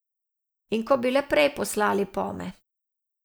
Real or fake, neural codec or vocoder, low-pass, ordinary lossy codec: real; none; none; none